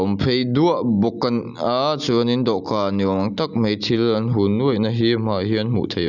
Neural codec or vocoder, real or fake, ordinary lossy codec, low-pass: none; real; none; 7.2 kHz